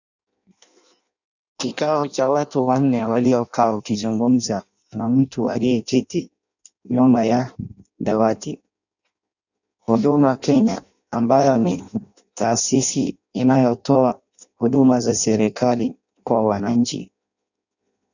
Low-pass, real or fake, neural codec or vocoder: 7.2 kHz; fake; codec, 16 kHz in and 24 kHz out, 0.6 kbps, FireRedTTS-2 codec